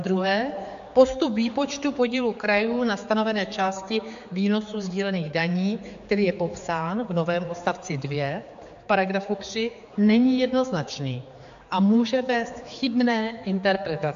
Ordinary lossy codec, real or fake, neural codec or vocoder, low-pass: AAC, 64 kbps; fake; codec, 16 kHz, 4 kbps, X-Codec, HuBERT features, trained on general audio; 7.2 kHz